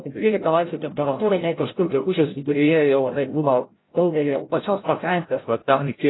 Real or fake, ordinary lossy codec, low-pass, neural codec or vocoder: fake; AAC, 16 kbps; 7.2 kHz; codec, 16 kHz, 0.5 kbps, FreqCodec, larger model